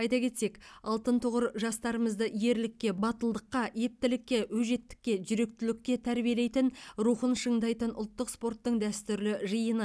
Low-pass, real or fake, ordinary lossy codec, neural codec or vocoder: none; real; none; none